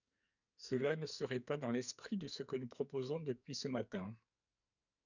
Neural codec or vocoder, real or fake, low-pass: codec, 44.1 kHz, 2.6 kbps, SNAC; fake; 7.2 kHz